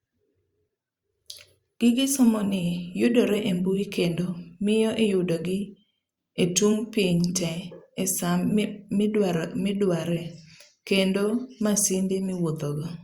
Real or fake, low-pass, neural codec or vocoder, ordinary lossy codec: fake; 19.8 kHz; vocoder, 44.1 kHz, 128 mel bands every 512 samples, BigVGAN v2; Opus, 64 kbps